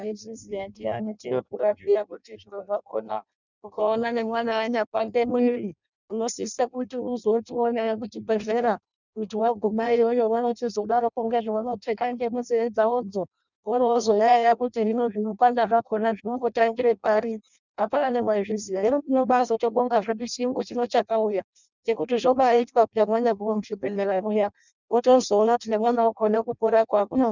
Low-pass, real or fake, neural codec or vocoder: 7.2 kHz; fake; codec, 16 kHz in and 24 kHz out, 0.6 kbps, FireRedTTS-2 codec